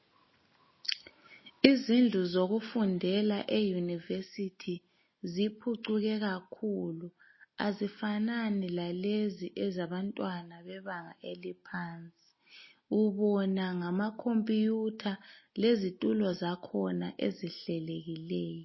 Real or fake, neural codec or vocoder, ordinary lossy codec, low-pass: real; none; MP3, 24 kbps; 7.2 kHz